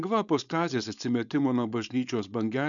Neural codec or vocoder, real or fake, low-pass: codec, 16 kHz, 4.8 kbps, FACodec; fake; 7.2 kHz